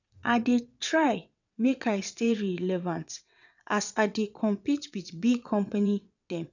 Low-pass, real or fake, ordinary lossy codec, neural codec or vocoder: 7.2 kHz; fake; none; vocoder, 22.05 kHz, 80 mel bands, WaveNeXt